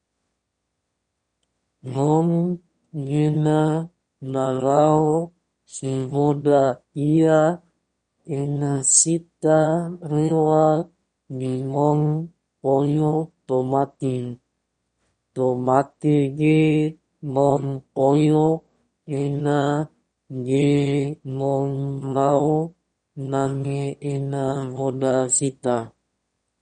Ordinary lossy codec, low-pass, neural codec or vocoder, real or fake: MP3, 48 kbps; 9.9 kHz; autoencoder, 22.05 kHz, a latent of 192 numbers a frame, VITS, trained on one speaker; fake